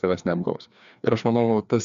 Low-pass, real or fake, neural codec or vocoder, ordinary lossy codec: 7.2 kHz; fake; codec, 16 kHz, 2 kbps, FreqCodec, larger model; MP3, 96 kbps